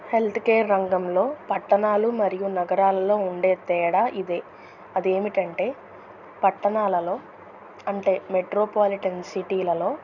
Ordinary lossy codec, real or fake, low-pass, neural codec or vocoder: none; real; 7.2 kHz; none